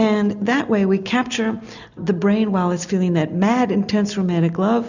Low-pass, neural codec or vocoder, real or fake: 7.2 kHz; none; real